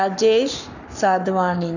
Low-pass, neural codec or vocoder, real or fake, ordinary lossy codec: 7.2 kHz; codec, 44.1 kHz, 7.8 kbps, Pupu-Codec; fake; none